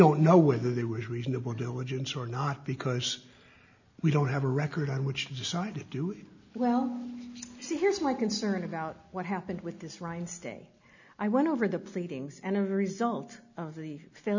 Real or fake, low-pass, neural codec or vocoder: real; 7.2 kHz; none